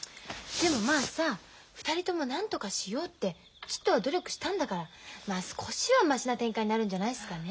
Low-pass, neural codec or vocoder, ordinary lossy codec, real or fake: none; none; none; real